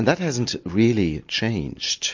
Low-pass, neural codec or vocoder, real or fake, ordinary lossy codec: 7.2 kHz; none; real; MP3, 48 kbps